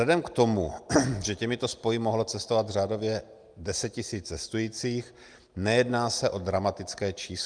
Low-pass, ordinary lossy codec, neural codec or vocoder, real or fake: 9.9 kHz; Opus, 32 kbps; none; real